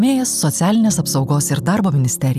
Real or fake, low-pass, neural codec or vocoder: real; 14.4 kHz; none